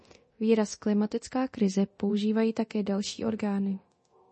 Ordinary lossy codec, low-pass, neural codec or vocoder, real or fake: MP3, 32 kbps; 10.8 kHz; codec, 24 kHz, 0.9 kbps, DualCodec; fake